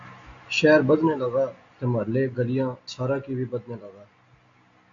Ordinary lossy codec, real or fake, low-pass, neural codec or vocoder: AAC, 48 kbps; real; 7.2 kHz; none